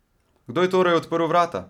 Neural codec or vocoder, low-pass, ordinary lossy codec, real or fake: none; 19.8 kHz; none; real